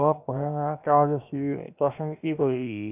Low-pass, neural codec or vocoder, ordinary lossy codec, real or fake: 3.6 kHz; codec, 16 kHz, 0.7 kbps, FocalCodec; none; fake